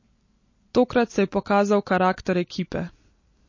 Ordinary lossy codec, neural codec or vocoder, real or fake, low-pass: MP3, 32 kbps; none; real; 7.2 kHz